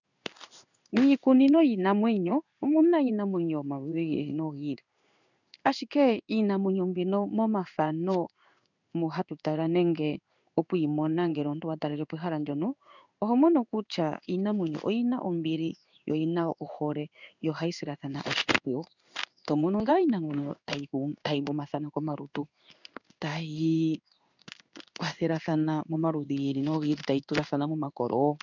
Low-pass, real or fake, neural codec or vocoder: 7.2 kHz; fake; codec, 16 kHz in and 24 kHz out, 1 kbps, XY-Tokenizer